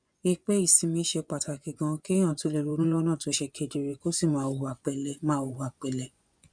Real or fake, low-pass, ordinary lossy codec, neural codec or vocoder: fake; 9.9 kHz; none; vocoder, 24 kHz, 100 mel bands, Vocos